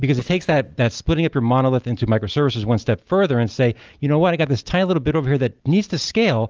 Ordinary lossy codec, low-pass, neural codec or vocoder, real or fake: Opus, 24 kbps; 7.2 kHz; none; real